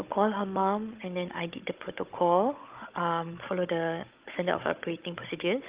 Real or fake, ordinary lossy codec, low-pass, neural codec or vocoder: fake; Opus, 32 kbps; 3.6 kHz; codec, 16 kHz, 16 kbps, FunCodec, trained on Chinese and English, 50 frames a second